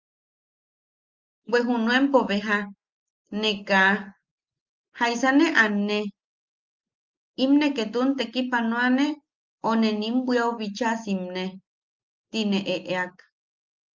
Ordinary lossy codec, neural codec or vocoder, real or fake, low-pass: Opus, 32 kbps; none; real; 7.2 kHz